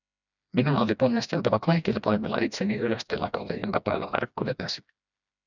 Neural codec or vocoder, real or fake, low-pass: codec, 16 kHz, 1 kbps, FreqCodec, smaller model; fake; 7.2 kHz